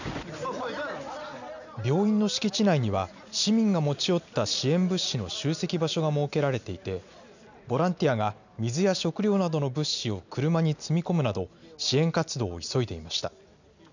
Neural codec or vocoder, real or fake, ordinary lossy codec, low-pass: none; real; none; 7.2 kHz